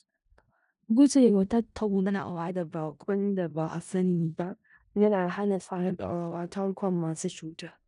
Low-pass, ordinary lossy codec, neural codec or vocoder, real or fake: 10.8 kHz; none; codec, 16 kHz in and 24 kHz out, 0.4 kbps, LongCat-Audio-Codec, four codebook decoder; fake